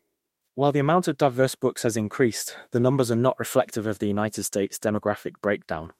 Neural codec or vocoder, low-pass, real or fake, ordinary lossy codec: autoencoder, 48 kHz, 32 numbers a frame, DAC-VAE, trained on Japanese speech; 19.8 kHz; fake; MP3, 64 kbps